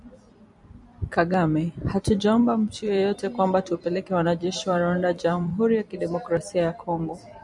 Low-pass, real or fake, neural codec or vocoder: 10.8 kHz; fake; vocoder, 44.1 kHz, 128 mel bands every 256 samples, BigVGAN v2